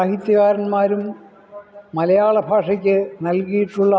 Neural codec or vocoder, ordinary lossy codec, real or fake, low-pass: none; none; real; none